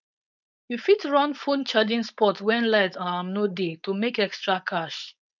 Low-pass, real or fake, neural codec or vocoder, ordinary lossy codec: 7.2 kHz; fake; codec, 16 kHz, 4.8 kbps, FACodec; none